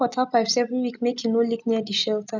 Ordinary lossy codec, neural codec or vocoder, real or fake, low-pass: none; none; real; 7.2 kHz